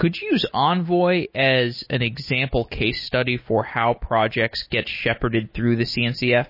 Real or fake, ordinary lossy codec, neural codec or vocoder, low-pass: real; MP3, 24 kbps; none; 5.4 kHz